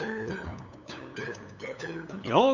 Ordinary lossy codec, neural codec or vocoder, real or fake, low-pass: none; codec, 16 kHz, 8 kbps, FunCodec, trained on LibriTTS, 25 frames a second; fake; 7.2 kHz